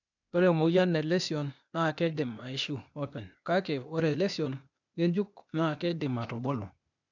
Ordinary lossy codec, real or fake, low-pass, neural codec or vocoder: none; fake; 7.2 kHz; codec, 16 kHz, 0.8 kbps, ZipCodec